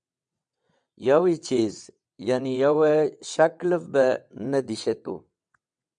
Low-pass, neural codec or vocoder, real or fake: 9.9 kHz; vocoder, 22.05 kHz, 80 mel bands, WaveNeXt; fake